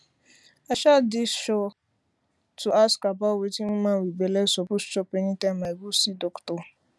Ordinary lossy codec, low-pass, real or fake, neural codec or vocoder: none; none; real; none